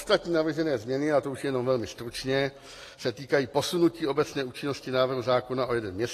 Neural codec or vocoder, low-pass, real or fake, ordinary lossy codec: none; 14.4 kHz; real; AAC, 48 kbps